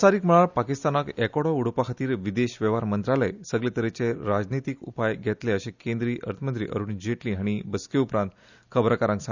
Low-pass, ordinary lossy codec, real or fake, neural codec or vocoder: 7.2 kHz; none; real; none